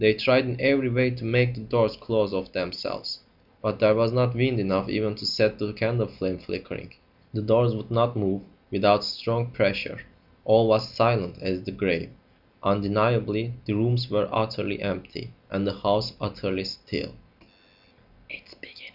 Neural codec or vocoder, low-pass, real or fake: none; 5.4 kHz; real